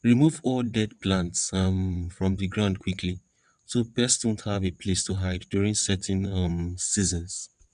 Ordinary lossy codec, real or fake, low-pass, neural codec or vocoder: none; fake; 9.9 kHz; vocoder, 22.05 kHz, 80 mel bands, WaveNeXt